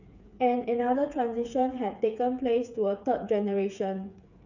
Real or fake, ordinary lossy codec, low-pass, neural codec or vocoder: fake; none; 7.2 kHz; codec, 16 kHz, 8 kbps, FreqCodec, smaller model